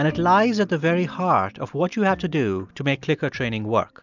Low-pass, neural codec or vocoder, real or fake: 7.2 kHz; none; real